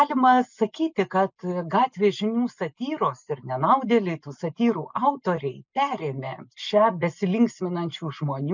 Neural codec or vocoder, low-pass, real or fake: none; 7.2 kHz; real